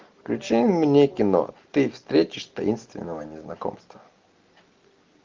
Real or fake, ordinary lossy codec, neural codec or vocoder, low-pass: real; Opus, 32 kbps; none; 7.2 kHz